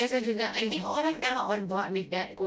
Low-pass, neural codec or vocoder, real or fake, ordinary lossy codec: none; codec, 16 kHz, 0.5 kbps, FreqCodec, smaller model; fake; none